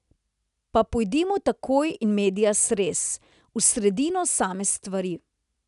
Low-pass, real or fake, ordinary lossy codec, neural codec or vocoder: 10.8 kHz; real; none; none